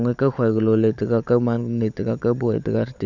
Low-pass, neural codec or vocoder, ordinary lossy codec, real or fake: 7.2 kHz; codec, 16 kHz, 16 kbps, FunCodec, trained on LibriTTS, 50 frames a second; none; fake